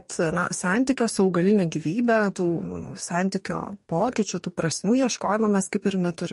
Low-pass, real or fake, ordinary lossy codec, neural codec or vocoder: 14.4 kHz; fake; MP3, 48 kbps; codec, 44.1 kHz, 2.6 kbps, DAC